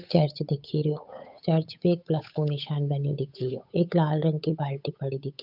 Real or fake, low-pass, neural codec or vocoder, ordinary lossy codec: fake; 5.4 kHz; codec, 16 kHz, 8 kbps, FunCodec, trained on Chinese and English, 25 frames a second; none